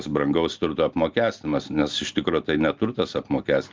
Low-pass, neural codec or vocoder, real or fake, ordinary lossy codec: 7.2 kHz; none; real; Opus, 24 kbps